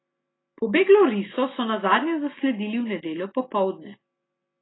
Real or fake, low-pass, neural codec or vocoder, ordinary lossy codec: real; 7.2 kHz; none; AAC, 16 kbps